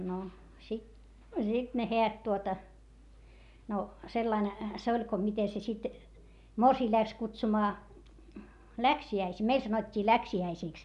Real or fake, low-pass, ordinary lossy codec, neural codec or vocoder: real; 10.8 kHz; none; none